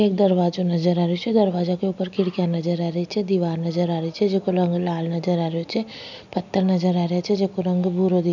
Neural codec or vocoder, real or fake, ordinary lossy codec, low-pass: none; real; none; 7.2 kHz